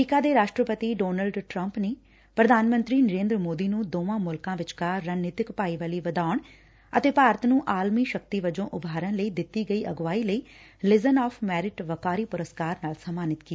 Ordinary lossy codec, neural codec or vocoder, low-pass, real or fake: none; none; none; real